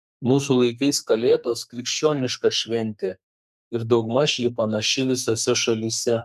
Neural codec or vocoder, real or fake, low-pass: codec, 32 kHz, 1.9 kbps, SNAC; fake; 14.4 kHz